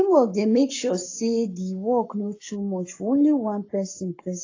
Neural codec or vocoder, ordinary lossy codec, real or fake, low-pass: codec, 44.1 kHz, 7.8 kbps, Pupu-Codec; AAC, 32 kbps; fake; 7.2 kHz